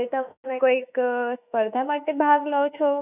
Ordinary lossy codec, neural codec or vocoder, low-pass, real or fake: none; autoencoder, 48 kHz, 32 numbers a frame, DAC-VAE, trained on Japanese speech; 3.6 kHz; fake